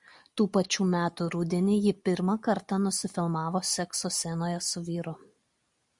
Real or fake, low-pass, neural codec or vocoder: real; 10.8 kHz; none